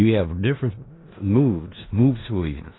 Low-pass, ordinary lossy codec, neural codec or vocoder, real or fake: 7.2 kHz; AAC, 16 kbps; codec, 16 kHz in and 24 kHz out, 0.4 kbps, LongCat-Audio-Codec, four codebook decoder; fake